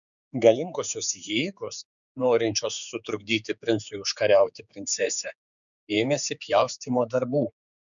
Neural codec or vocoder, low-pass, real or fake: codec, 16 kHz, 4 kbps, X-Codec, HuBERT features, trained on general audio; 7.2 kHz; fake